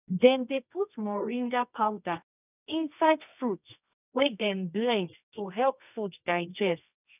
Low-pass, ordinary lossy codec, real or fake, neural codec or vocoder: 3.6 kHz; none; fake; codec, 24 kHz, 0.9 kbps, WavTokenizer, medium music audio release